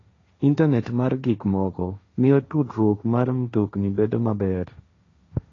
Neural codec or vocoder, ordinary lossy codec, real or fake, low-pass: codec, 16 kHz, 1.1 kbps, Voila-Tokenizer; AAC, 32 kbps; fake; 7.2 kHz